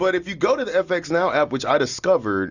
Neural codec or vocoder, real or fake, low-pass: none; real; 7.2 kHz